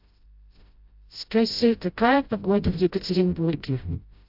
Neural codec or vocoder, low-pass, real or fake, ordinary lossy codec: codec, 16 kHz, 0.5 kbps, FreqCodec, smaller model; 5.4 kHz; fake; AAC, 48 kbps